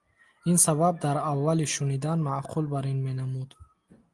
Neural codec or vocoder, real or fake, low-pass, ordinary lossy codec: none; real; 10.8 kHz; Opus, 24 kbps